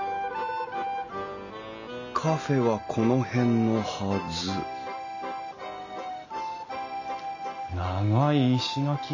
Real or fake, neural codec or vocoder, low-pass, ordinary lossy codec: real; none; 7.2 kHz; none